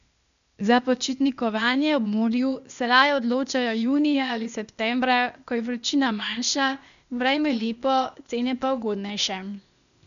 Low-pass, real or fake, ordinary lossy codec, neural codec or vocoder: 7.2 kHz; fake; none; codec, 16 kHz, 0.8 kbps, ZipCodec